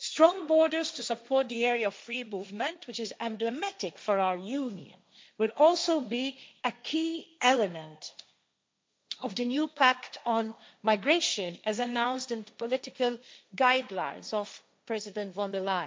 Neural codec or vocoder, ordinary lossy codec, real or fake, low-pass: codec, 16 kHz, 1.1 kbps, Voila-Tokenizer; none; fake; none